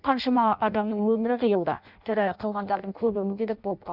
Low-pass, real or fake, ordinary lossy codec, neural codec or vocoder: 5.4 kHz; fake; Opus, 64 kbps; codec, 16 kHz in and 24 kHz out, 0.6 kbps, FireRedTTS-2 codec